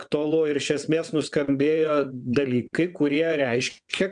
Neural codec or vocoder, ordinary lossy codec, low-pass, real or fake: vocoder, 22.05 kHz, 80 mel bands, WaveNeXt; MP3, 96 kbps; 9.9 kHz; fake